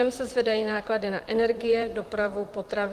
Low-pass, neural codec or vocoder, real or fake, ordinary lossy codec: 14.4 kHz; vocoder, 44.1 kHz, 128 mel bands, Pupu-Vocoder; fake; Opus, 24 kbps